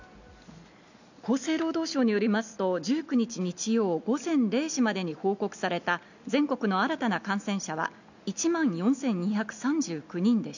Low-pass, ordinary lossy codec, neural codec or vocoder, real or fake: 7.2 kHz; none; none; real